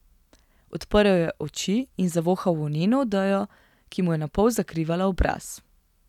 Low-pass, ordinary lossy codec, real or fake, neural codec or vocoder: 19.8 kHz; none; real; none